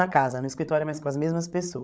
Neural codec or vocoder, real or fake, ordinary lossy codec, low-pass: codec, 16 kHz, 16 kbps, FreqCodec, larger model; fake; none; none